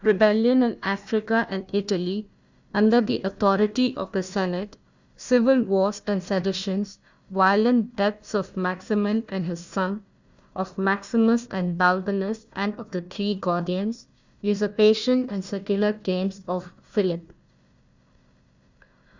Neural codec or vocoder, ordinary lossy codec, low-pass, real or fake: codec, 16 kHz, 1 kbps, FunCodec, trained on Chinese and English, 50 frames a second; Opus, 64 kbps; 7.2 kHz; fake